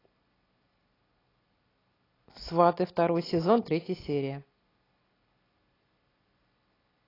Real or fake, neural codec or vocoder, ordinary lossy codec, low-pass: real; none; AAC, 24 kbps; 5.4 kHz